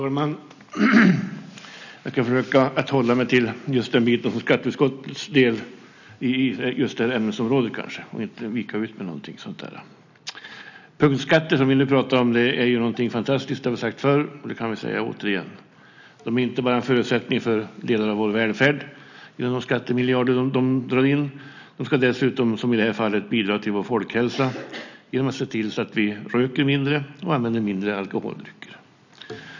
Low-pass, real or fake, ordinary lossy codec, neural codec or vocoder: 7.2 kHz; real; none; none